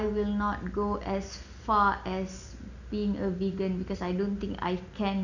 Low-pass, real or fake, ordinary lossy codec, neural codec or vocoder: 7.2 kHz; real; none; none